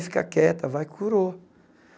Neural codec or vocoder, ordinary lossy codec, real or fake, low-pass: none; none; real; none